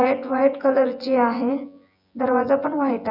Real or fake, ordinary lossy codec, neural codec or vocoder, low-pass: fake; none; vocoder, 24 kHz, 100 mel bands, Vocos; 5.4 kHz